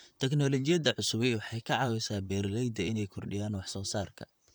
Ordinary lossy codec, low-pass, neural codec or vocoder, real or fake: none; none; vocoder, 44.1 kHz, 128 mel bands, Pupu-Vocoder; fake